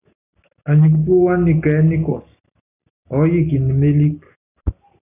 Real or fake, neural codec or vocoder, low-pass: real; none; 3.6 kHz